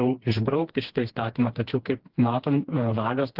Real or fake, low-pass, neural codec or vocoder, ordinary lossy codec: fake; 5.4 kHz; codec, 16 kHz, 2 kbps, FreqCodec, smaller model; Opus, 16 kbps